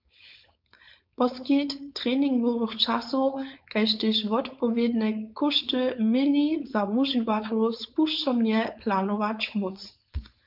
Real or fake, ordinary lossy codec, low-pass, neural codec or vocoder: fake; MP3, 48 kbps; 5.4 kHz; codec, 16 kHz, 4.8 kbps, FACodec